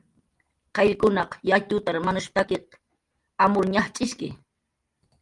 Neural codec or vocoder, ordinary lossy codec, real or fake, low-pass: none; Opus, 32 kbps; real; 10.8 kHz